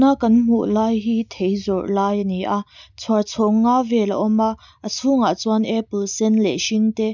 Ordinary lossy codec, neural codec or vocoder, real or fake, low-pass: none; none; real; 7.2 kHz